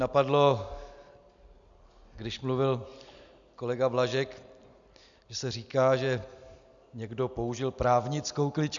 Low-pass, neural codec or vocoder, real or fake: 7.2 kHz; none; real